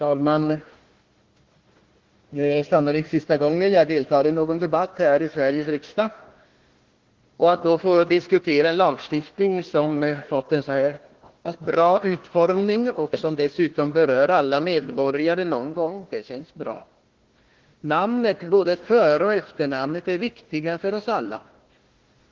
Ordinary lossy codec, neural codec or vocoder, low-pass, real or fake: Opus, 16 kbps; codec, 16 kHz, 1 kbps, FunCodec, trained on Chinese and English, 50 frames a second; 7.2 kHz; fake